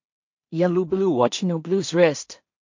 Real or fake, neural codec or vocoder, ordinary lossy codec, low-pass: fake; codec, 16 kHz in and 24 kHz out, 0.4 kbps, LongCat-Audio-Codec, two codebook decoder; MP3, 48 kbps; 7.2 kHz